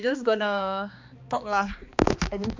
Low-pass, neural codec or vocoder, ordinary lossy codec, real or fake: 7.2 kHz; codec, 16 kHz, 2 kbps, X-Codec, HuBERT features, trained on balanced general audio; MP3, 64 kbps; fake